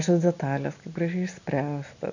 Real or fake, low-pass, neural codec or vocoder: real; 7.2 kHz; none